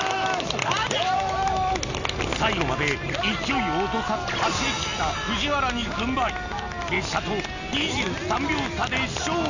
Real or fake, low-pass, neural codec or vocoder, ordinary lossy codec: real; 7.2 kHz; none; none